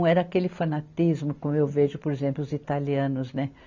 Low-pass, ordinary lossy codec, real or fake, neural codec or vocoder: 7.2 kHz; none; real; none